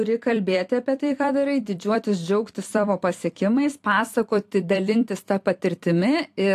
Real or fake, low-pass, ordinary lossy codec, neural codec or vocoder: fake; 14.4 kHz; AAC, 64 kbps; vocoder, 44.1 kHz, 128 mel bands every 256 samples, BigVGAN v2